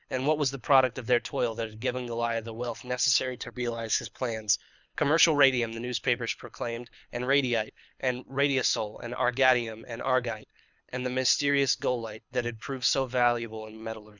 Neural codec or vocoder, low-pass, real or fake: codec, 24 kHz, 6 kbps, HILCodec; 7.2 kHz; fake